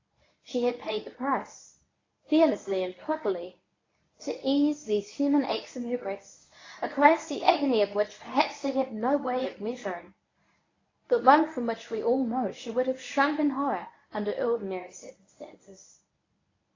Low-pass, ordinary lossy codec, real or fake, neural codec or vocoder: 7.2 kHz; AAC, 32 kbps; fake; codec, 24 kHz, 0.9 kbps, WavTokenizer, medium speech release version 1